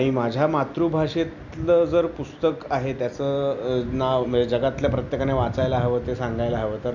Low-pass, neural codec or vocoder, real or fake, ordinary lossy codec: 7.2 kHz; none; real; none